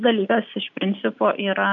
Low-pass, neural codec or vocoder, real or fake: 7.2 kHz; none; real